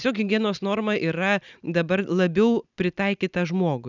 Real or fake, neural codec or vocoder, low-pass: real; none; 7.2 kHz